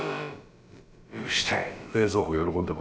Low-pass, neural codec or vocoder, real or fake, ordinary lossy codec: none; codec, 16 kHz, about 1 kbps, DyCAST, with the encoder's durations; fake; none